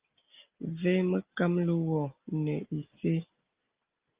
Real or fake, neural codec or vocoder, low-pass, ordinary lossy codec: real; none; 3.6 kHz; Opus, 24 kbps